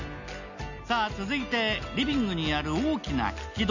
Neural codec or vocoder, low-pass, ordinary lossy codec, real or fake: none; 7.2 kHz; none; real